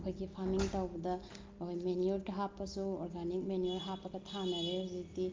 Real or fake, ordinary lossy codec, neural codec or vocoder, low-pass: real; Opus, 24 kbps; none; 7.2 kHz